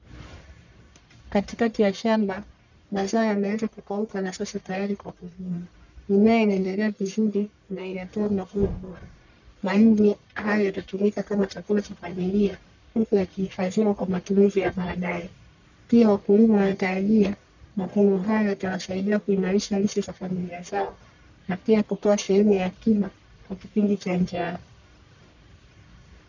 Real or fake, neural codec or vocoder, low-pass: fake; codec, 44.1 kHz, 1.7 kbps, Pupu-Codec; 7.2 kHz